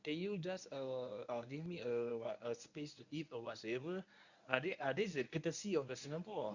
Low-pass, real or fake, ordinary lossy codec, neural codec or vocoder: 7.2 kHz; fake; none; codec, 24 kHz, 0.9 kbps, WavTokenizer, medium speech release version 1